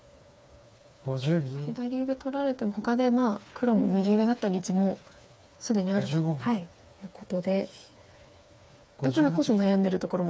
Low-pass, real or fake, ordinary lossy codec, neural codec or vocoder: none; fake; none; codec, 16 kHz, 4 kbps, FreqCodec, smaller model